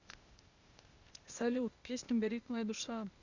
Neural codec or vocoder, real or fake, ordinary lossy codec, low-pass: codec, 16 kHz, 0.8 kbps, ZipCodec; fake; Opus, 64 kbps; 7.2 kHz